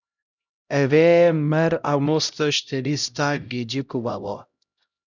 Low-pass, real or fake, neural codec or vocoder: 7.2 kHz; fake; codec, 16 kHz, 0.5 kbps, X-Codec, HuBERT features, trained on LibriSpeech